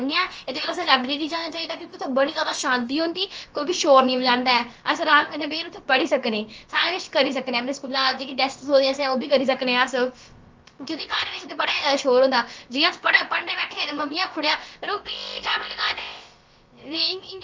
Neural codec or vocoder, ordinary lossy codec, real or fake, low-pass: codec, 16 kHz, about 1 kbps, DyCAST, with the encoder's durations; Opus, 24 kbps; fake; 7.2 kHz